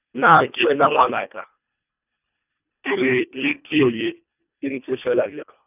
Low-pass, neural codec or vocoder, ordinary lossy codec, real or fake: 3.6 kHz; codec, 24 kHz, 1.5 kbps, HILCodec; none; fake